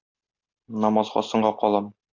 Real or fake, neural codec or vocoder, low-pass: real; none; 7.2 kHz